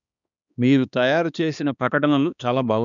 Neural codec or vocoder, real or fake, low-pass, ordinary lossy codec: codec, 16 kHz, 2 kbps, X-Codec, HuBERT features, trained on balanced general audio; fake; 7.2 kHz; none